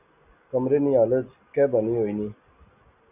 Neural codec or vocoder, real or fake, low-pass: none; real; 3.6 kHz